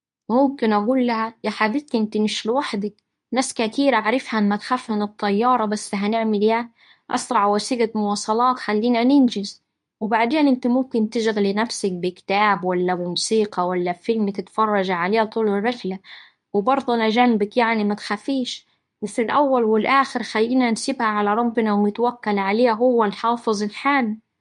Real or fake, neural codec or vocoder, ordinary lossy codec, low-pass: fake; codec, 24 kHz, 0.9 kbps, WavTokenizer, medium speech release version 2; MP3, 64 kbps; 10.8 kHz